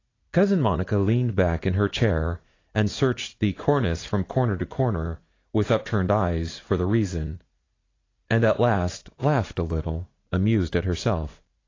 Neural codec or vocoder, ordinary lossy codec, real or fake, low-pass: vocoder, 44.1 kHz, 80 mel bands, Vocos; AAC, 32 kbps; fake; 7.2 kHz